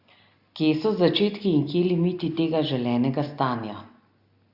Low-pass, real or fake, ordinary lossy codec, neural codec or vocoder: 5.4 kHz; real; Opus, 64 kbps; none